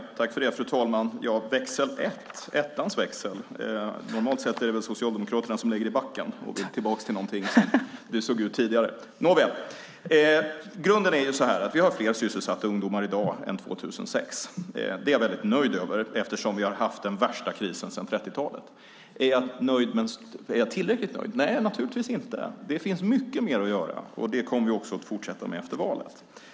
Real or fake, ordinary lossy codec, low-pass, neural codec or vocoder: real; none; none; none